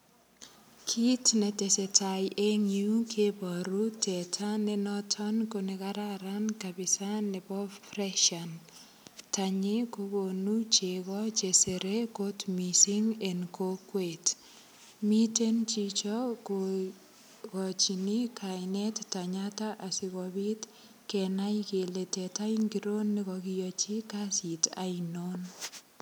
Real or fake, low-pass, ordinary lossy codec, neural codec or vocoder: real; none; none; none